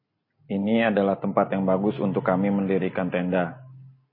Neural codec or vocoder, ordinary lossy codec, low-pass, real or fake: none; MP3, 24 kbps; 5.4 kHz; real